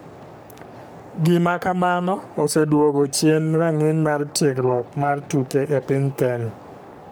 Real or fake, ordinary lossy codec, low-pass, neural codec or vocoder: fake; none; none; codec, 44.1 kHz, 3.4 kbps, Pupu-Codec